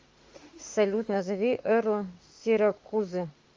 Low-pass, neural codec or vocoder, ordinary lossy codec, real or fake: 7.2 kHz; autoencoder, 48 kHz, 32 numbers a frame, DAC-VAE, trained on Japanese speech; Opus, 32 kbps; fake